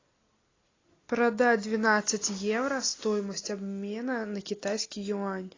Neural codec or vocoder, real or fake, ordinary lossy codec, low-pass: none; real; AAC, 32 kbps; 7.2 kHz